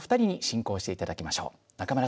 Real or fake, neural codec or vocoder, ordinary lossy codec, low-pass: real; none; none; none